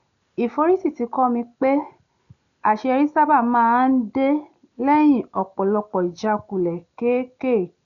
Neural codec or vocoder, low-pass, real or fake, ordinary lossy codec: none; 7.2 kHz; real; none